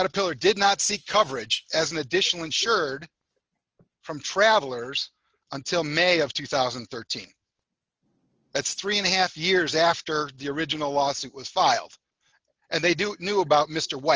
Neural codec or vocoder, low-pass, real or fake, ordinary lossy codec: none; 7.2 kHz; real; Opus, 24 kbps